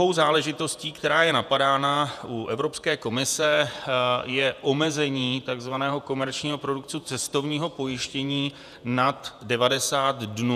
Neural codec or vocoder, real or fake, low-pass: vocoder, 48 kHz, 128 mel bands, Vocos; fake; 14.4 kHz